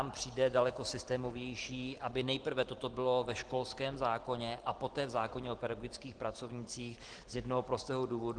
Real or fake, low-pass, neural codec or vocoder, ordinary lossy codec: real; 9.9 kHz; none; Opus, 16 kbps